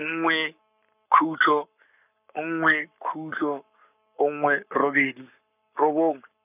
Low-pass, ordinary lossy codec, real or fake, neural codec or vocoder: 3.6 kHz; none; fake; codec, 44.1 kHz, 7.8 kbps, Pupu-Codec